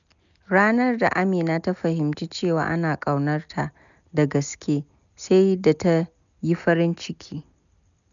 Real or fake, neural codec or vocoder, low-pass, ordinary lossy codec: real; none; 7.2 kHz; none